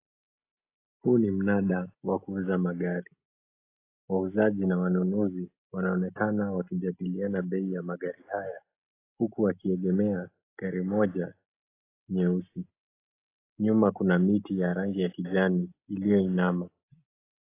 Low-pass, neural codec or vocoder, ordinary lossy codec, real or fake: 3.6 kHz; none; AAC, 24 kbps; real